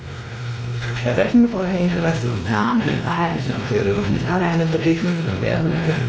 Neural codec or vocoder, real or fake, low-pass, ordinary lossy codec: codec, 16 kHz, 1 kbps, X-Codec, WavLM features, trained on Multilingual LibriSpeech; fake; none; none